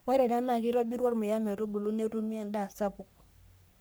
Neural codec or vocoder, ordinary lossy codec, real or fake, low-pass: codec, 44.1 kHz, 3.4 kbps, Pupu-Codec; none; fake; none